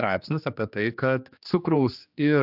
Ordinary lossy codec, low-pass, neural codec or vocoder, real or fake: AAC, 48 kbps; 5.4 kHz; codec, 16 kHz, 2 kbps, X-Codec, HuBERT features, trained on general audio; fake